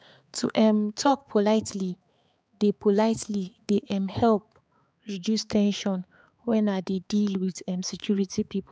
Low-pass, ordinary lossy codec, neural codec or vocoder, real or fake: none; none; codec, 16 kHz, 4 kbps, X-Codec, HuBERT features, trained on balanced general audio; fake